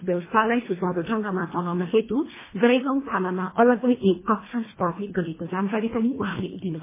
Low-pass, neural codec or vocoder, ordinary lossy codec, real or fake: 3.6 kHz; codec, 24 kHz, 1.5 kbps, HILCodec; MP3, 16 kbps; fake